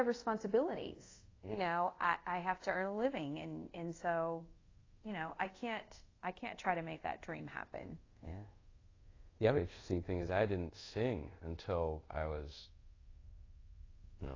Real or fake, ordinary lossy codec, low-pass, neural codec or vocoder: fake; AAC, 32 kbps; 7.2 kHz; codec, 24 kHz, 0.5 kbps, DualCodec